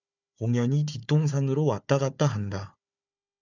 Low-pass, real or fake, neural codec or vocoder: 7.2 kHz; fake; codec, 16 kHz, 4 kbps, FunCodec, trained on Chinese and English, 50 frames a second